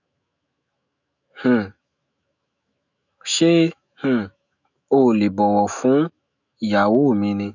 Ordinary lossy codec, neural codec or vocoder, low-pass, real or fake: none; autoencoder, 48 kHz, 128 numbers a frame, DAC-VAE, trained on Japanese speech; 7.2 kHz; fake